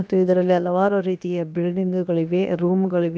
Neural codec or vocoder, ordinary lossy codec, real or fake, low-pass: codec, 16 kHz, about 1 kbps, DyCAST, with the encoder's durations; none; fake; none